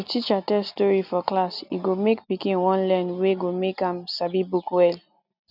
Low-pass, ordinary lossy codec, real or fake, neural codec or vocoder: 5.4 kHz; none; real; none